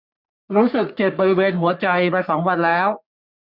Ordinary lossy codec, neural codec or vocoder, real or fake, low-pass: none; codec, 44.1 kHz, 3.4 kbps, Pupu-Codec; fake; 5.4 kHz